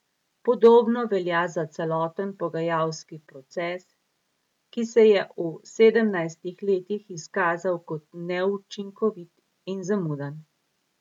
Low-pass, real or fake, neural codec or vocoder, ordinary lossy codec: 19.8 kHz; real; none; MP3, 96 kbps